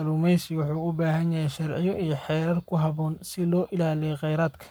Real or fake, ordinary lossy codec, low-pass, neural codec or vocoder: fake; none; none; codec, 44.1 kHz, 7.8 kbps, Pupu-Codec